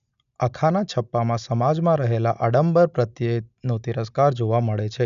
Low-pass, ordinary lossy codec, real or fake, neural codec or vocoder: 7.2 kHz; none; real; none